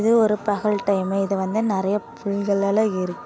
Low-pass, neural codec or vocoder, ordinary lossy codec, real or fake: none; none; none; real